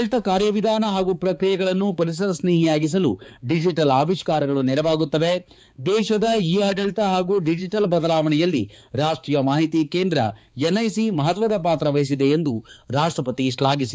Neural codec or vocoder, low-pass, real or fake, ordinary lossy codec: codec, 16 kHz, 4 kbps, X-Codec, HuBERT features, trained on balanced general audio; none; fake; none